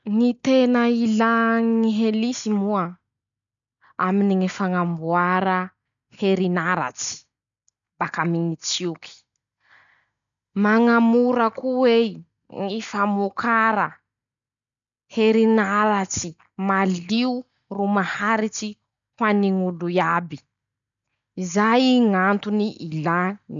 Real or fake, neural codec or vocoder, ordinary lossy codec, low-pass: real; none; none; 7.2 kHz